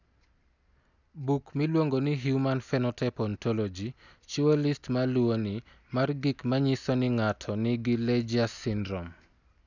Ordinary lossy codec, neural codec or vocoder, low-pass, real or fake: none; none; 7.2 kHz; real